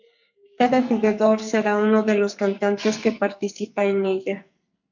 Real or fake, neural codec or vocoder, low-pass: fake; codec, 44.1 kHz, 2.6 kbps, SNAC; 7.2 kHz